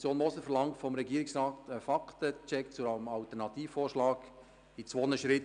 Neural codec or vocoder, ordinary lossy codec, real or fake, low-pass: none; none; real; 9.9 kHz